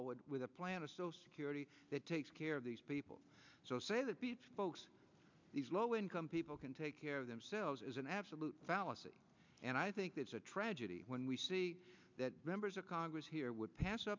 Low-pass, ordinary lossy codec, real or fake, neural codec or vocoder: 7.2 kHz; MP3, 64 kbps; real; none